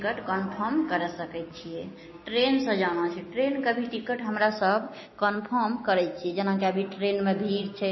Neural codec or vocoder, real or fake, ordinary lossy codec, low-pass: none; real; MP3, 24 kbps; 7.2 kHz